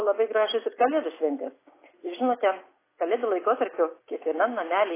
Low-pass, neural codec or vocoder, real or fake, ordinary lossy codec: 3.6 kHz; none; real; AAC, 16 kbps